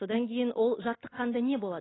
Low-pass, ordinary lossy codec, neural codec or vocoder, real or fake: 7.2 kHz; AAC, 16 kbps; none; real